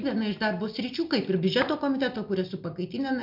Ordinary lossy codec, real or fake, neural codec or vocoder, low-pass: MP3, 48 kbps; real; none; 5.4 kHz